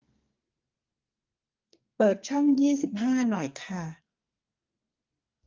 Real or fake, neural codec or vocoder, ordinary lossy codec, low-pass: fake; codec, 44.1 kHz, 2.6 kbps, SNAC; Opus, 32 kbps; 7.2 kHz